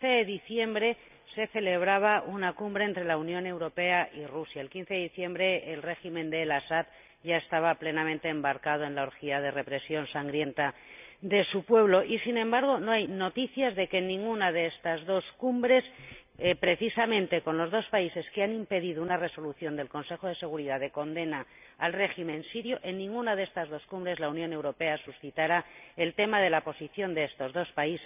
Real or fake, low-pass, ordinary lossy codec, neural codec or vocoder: real; 3.6 kHz; none; none